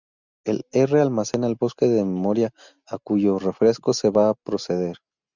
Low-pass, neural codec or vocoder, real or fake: 7.2 kHz; none; real